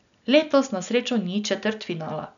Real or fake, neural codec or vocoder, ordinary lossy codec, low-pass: real; none; none; 7.2 kHz